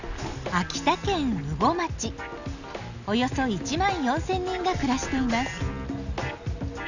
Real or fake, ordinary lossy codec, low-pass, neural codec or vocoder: real; none; 7.2 kHz; none